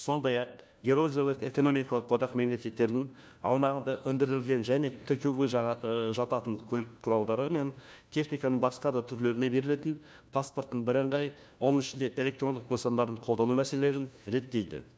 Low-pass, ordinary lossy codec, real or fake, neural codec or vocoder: none; none; fake; codec, 16 kHz, 1 kbps, FunCodec, trained on LibriTTS, 50 frames a second